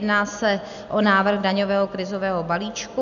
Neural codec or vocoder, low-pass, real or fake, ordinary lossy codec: none; 7.2 kHz; real; MP3, 96 kbps